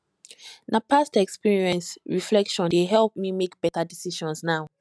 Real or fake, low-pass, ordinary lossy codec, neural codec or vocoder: real; none; none; none